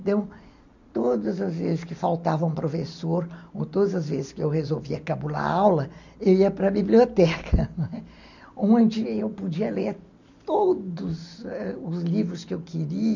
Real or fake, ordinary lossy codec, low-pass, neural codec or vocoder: real; none; 7.2 kHz; none